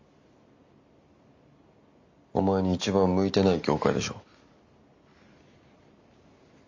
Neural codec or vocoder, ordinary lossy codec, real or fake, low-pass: none; MP3, 48 kbps; real; 7.2 kHz